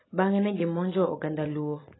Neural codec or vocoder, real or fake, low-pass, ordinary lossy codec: none; real; 7.2 kHz; AAC, 16 kbps